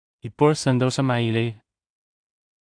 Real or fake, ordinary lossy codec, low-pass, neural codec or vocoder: fake; AAC, 64 kbps; 9.9 kHz; codec, 16 kHz in and 24 kHz out, 0.4 kbps, LongCat-Audio-Codec, two codebook decoder